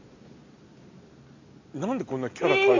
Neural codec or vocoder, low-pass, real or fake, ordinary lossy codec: none; 7.2 kHz; real; AAC, 48 kbps